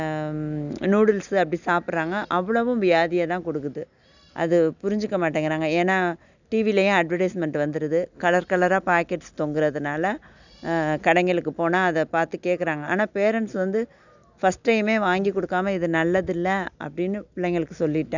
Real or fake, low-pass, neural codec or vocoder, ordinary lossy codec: real; 7.2 kHz; none; none